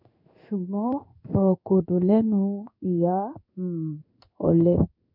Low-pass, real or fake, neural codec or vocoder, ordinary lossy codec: 5.4 kHz; fake; codec, 16 kHz in and 24 kHz out, 1 kbps, XY-Tokenizer; none